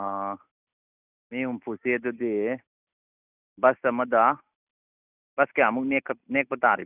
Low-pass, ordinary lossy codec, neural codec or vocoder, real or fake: 3.6 kHz; none; none; real